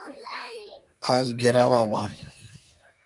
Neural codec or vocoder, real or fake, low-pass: codec, 24 kHz, 1 kbps, SNAC; fake; 10.8 kHz